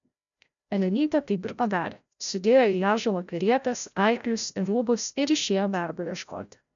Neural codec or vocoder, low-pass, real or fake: codec, 16 kHz, 0.5 kbps, FreqCodec, larger model; 7.2 kHz; fake